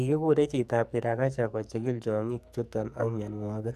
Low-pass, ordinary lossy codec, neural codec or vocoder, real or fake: 14.4 kHz; none; codec, 44.1 kHz, 2.6 kbps, SNAC; fake